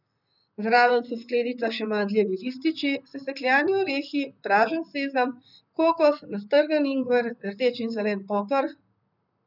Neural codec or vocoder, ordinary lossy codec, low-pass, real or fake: vocoder, 44.1 kHz, 128 mel bands, Pupu-Vocoder; none; 5.4 kHz; fake